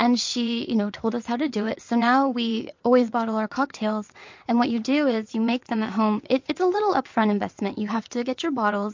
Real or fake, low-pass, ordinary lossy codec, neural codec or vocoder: fake; 7.2 kHz; MP3, 48 kbps; vocoder, 22.05 kHz, 80 mel bands, WaveNeXt